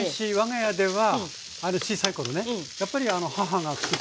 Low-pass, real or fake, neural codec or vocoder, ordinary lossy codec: none; real; none; none